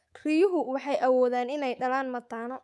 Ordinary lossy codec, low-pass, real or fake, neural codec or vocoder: none; none; fake; codec, 24 kHz, 3.1 kbps, DualCodec